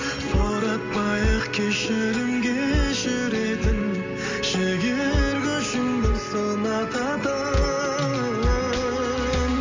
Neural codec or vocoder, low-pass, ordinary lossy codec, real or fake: none; 7.2 kHz; MP3, 64 kbps; real